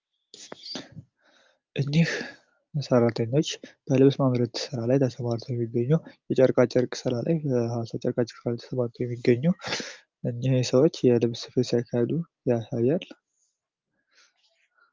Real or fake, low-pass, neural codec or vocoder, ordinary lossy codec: real; 7.2 kHz; none; Opus, 32 kbps